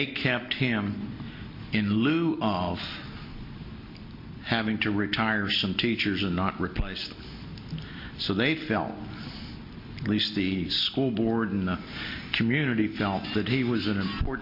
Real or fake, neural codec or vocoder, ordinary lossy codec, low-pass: real; none; MP3, 32 kbps; 5.4 kHz